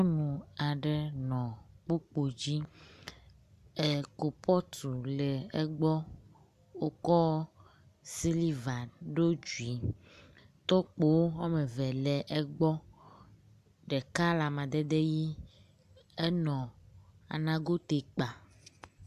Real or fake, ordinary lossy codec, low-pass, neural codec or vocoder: real; MP3, 96 kbps; 14.4 kHz; none